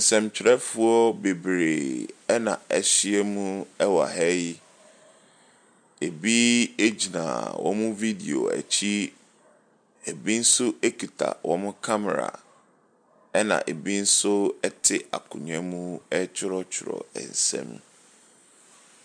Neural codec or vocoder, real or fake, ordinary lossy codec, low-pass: none; real; MP3, 96 kbps; 9.9 kHz